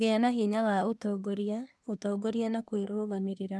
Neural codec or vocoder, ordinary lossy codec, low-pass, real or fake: codec, 24 kHz, 1 kbps, SNAC; none; none; fake